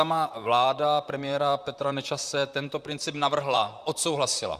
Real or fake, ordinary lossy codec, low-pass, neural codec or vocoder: fake; Opus, 64 kbps; 14.4 kHz; vocoder, 44.1 kHz, 128 mel bands, Pupu-Vocoder